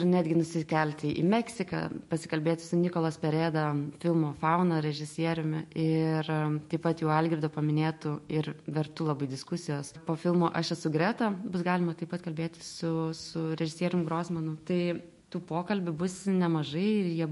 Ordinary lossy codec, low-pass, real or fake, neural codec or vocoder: MP3, 48 kbps; 14.4 kHz; fake; autoencoder, 48 kHz, 128 numbers a frame, DAC-VAE, trained on Japanese speech